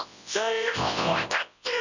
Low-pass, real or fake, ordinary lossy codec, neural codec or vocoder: 7.2 kHz; fake; none; codec, 24 kHz, 0.9 kbps, WavTokenizer, large speech release